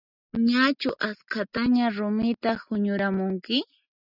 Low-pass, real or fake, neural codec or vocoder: 5.4 kHz; real; none